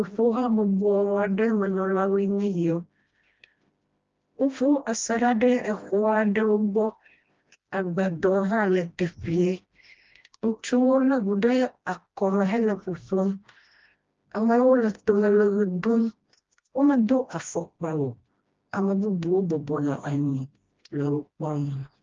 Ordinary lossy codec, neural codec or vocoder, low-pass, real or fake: Opus, 24 kbps; codec, 16 kHz, 1 kbps, FreqCodec, smaller model; 7.2 kHz; fake